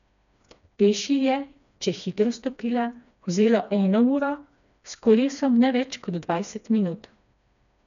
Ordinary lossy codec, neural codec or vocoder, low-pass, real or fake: none; codec, 16 kHz, 2 kbps, FreqCodec, smaller model; 7.2 kHz; fake